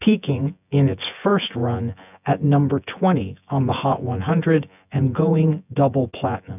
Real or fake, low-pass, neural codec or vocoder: fake; 3.6 kHz; vocoder, 24 kHz, 100 mel bands, Vocos